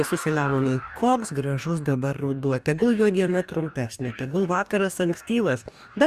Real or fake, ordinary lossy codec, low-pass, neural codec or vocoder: fake; Opus, 64 kbps; 14.4 kHz; codec, 44.1 kHz, 2.6 kbps, DAC